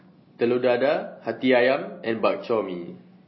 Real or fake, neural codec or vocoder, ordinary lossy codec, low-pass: real; none; MP3, 24 kbps; 7.2 kHz